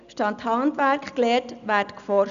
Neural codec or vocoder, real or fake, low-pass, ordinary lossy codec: none; real; 7.2 kHz; none